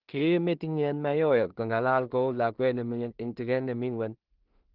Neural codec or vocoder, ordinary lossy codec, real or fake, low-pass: codec, 16 kHz in and 24 kHz out, 0.4 kbps, LongCat-Audio-Codec, two codebook decoder; Opus, 16 kbps; fake; 5.4 kHz